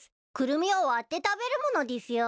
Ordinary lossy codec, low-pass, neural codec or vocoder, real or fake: none; none; none; real